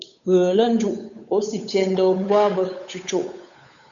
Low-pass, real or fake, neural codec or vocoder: 7.2 kHz; fake; codec, 16 kHz, 8 kbps, FunCodec, trained on Chinese and English, 25 frames a second